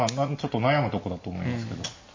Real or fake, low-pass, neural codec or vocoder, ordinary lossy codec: real; 7.2 kHz; none; MP3, 32 kbps